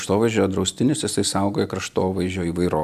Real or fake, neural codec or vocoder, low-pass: real; none; 14.4 kHz